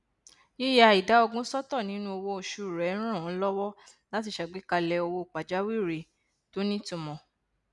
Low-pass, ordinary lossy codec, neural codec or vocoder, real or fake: 10.8 kHz; none; none; real